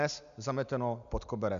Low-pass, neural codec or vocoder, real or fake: 7.2 kHz; none; real